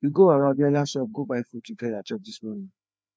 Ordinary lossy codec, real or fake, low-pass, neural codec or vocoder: none; fake; none; codec, 16 kHz, 2 kbps, FreqCodec, larger model